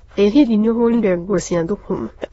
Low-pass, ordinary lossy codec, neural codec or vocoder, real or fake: 9.9 kHz; AAC, 24 kbps; autoencoder, 22.05 kHz, a latent of 192 numbers a frame, VITS, trained on many speakers; fake